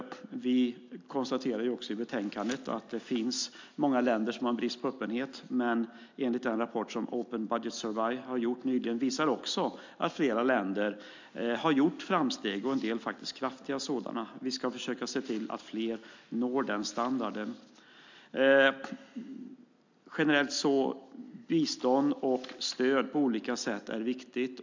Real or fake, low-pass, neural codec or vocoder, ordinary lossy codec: real; 7.2 kHz; none; MP3, 64 kbps